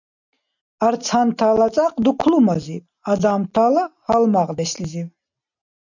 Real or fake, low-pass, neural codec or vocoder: real; 7.2 kHz; none